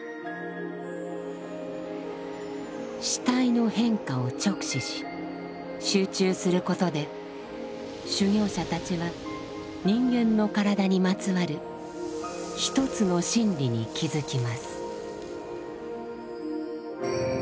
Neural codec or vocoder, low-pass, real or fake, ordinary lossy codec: none; none; real; none